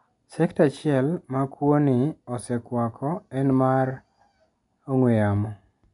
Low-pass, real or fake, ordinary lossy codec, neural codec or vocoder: 10.8 kHz; real; none; none